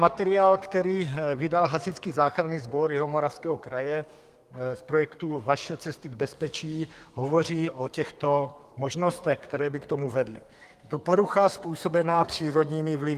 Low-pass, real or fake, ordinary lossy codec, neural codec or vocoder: 14.4 kHz; fake; Opus, 32 kbps; codec, 32 kHz, 1.9 kbps, SNAC